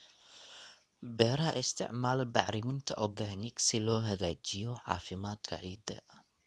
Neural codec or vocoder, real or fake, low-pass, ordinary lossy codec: codec, 24 kHz, 0.9 kbps, WavTokenizer, medium speech release version 2; fake; none; none